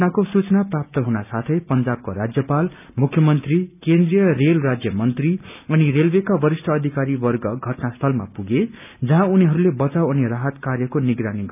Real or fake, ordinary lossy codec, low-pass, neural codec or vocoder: real; none; 3.6 kHz; none